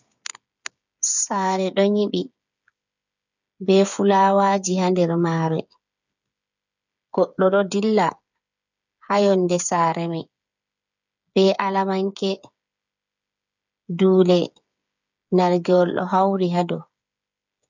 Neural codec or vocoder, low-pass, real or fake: codec, 16 kHz, 8 kbps, FreqCodec, smaller model; 7.2 kHz; fake